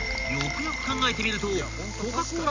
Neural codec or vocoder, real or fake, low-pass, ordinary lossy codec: none; real; 7.2 kHz; Opus, 64 kbps